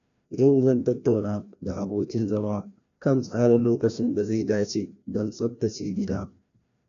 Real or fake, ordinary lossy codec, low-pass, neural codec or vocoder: fake; none; 7.2 kHz; codec, 16 kHz, 1 kbps, FreqCodec, larger model